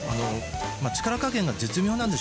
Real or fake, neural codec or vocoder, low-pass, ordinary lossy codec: real; none; none; none